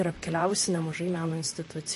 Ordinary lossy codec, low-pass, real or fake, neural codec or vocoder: MP3, 48 kbps; 14.4 kHz; fake; vocoder, 44.1 kHz, 128 mel bands, Pupu-Vocoder